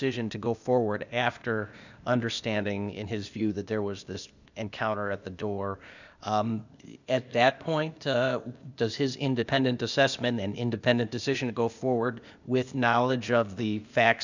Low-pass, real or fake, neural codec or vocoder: 7.2 kHz; fake; codec, 16 kHz, 0.8 kbps, ZipCodec